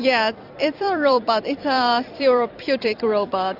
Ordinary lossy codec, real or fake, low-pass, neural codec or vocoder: AAC, 48 kbps; fake; 5.4 kHz; vocoder, 44.1 kHz, 128 mel bands every 256 samples, BigVGAN v2